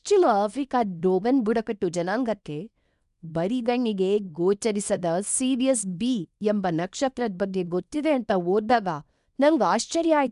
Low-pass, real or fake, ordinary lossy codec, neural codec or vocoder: 10.8 kHz; fake; none; codec, 24 kHz, 0.9 kbps, WavTokenizer, small release